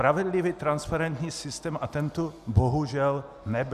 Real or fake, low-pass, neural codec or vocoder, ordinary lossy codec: real; 14.4 kHz; none; Opus, 64 kbps